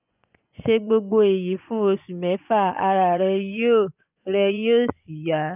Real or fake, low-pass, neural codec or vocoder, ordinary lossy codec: fake; 3.6 kHz; vocoder, 24 kHz, 100 mel bands, Vocos; none